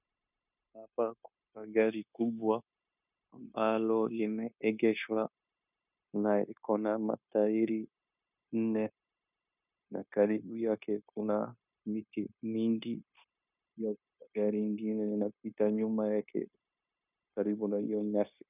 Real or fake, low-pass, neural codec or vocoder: fake; 3.6 kHz; codec, 16 kHz, 0.9 kbps, LongCat-Audio-Codec